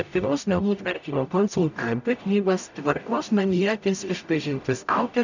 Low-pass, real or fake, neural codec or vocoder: 7.2 kHz; fake; codec, 44.1 kHz, 0.9 kbps, DAC